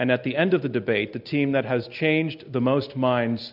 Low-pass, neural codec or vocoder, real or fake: 5.4 kHz; none; real